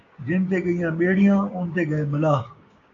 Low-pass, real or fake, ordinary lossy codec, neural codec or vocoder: 7.2 kHz; fake; AAC, 48 kbps; codec, 16 kHz, 6 kbps, DAC